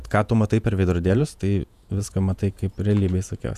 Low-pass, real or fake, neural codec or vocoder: 14.4 kHz; fake; vocoder, 48 kHz, 128 mel bands, Vocos